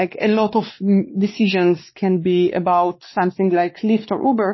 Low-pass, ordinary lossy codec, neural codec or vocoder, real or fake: 7.2 kHz; MP3, 24 kbps; codec, 16 kHz, 2 kbps, X-Codec, WavLM features, trained on Multilingual LibriSpeech; fake